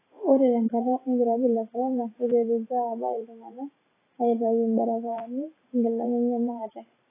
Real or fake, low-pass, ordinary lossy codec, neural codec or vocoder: fake; 3.6 kHz; AAC, 16 kbps; vocoder, 44.1 kHz, 128 mel bands every 512 samples, BigVGAN v2